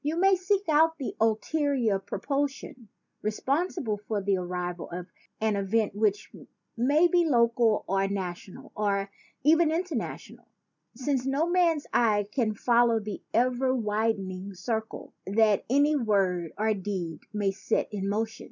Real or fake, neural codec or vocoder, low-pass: real; none; 7.2 kHz